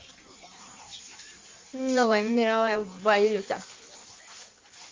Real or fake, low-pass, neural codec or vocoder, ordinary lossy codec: fake; 7.2 kHz; codec, 16 kHz in and 24 kHz out, 1.1 kbps, FireRedTTS-2 codec; Opus, 32 kbps